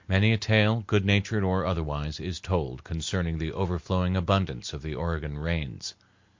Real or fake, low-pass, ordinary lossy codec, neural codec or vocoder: real; 7.2 kHz; MP3, 48 kbps; none